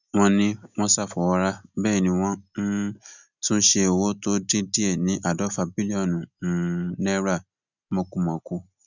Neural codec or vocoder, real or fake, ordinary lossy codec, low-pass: none; real; none; 7.2 kHz